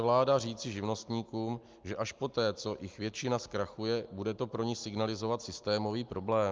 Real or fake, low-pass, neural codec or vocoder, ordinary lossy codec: real; 7.2 kHz; none; Opus, 24 kbps